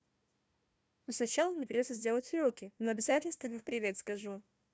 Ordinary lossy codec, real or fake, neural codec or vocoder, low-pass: none; fake; codec, 16 kHz, 1 kbps, FunCodec, trained on Chinese and English, 50 frames a second; none